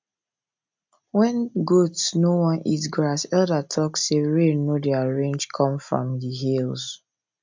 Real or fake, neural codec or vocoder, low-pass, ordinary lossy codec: real; none; 7.2 kHz; MP3, 64 kbps